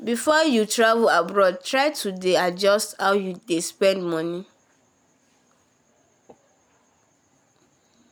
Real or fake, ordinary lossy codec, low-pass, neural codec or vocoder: real; none; none; none